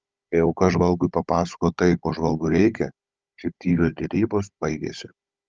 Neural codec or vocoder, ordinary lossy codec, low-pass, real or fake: codec, 16 kHz, 16 kbps, FunCodec, trained on Chinese and English, 50 frames a second; Opus, 24 kbps; 7.2 kHz; fake